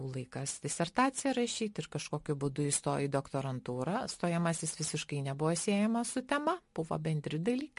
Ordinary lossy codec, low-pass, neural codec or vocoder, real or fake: MP3, 48 kbps; 10.8 kHz; none; real